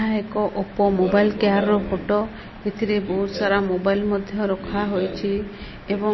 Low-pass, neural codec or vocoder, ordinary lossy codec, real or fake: 7.2 kHz; none; MP3, 24 kbps; real